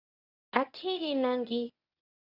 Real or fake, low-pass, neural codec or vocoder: fake; 5.4 kHz; codec, 24 kHz, 0.9 kbps, WavTokenizer, medium speech release version 1